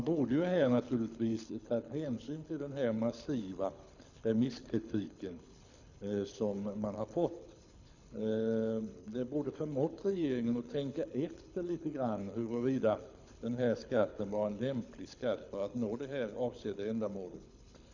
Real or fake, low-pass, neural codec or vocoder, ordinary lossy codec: fake; 7.2 kHz; codec, 24 kHz, 6 kbps, HILCodec; none